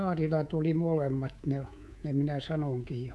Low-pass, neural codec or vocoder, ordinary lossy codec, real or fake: none; codec, 24 kHz, 3.1 kbps, DualCodec; none; fake